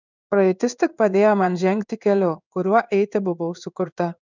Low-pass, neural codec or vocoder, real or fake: 7.2 kHz; codec, 16 kHz in and 24 kHz out, 1 kbps, XY-Tokenizer; fake